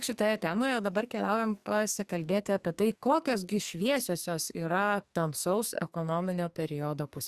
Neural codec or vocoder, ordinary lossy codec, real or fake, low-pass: codec, 44.1 kHz, 2.6 kbps, SNAC; Opus, 64 kbps; fake; 14.4 kHz